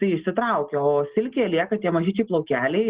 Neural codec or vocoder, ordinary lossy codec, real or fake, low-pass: none; Opus, 16 kbps; real; 3.6 kHz